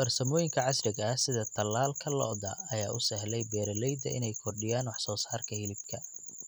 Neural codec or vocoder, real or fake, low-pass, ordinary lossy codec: none; real; none; none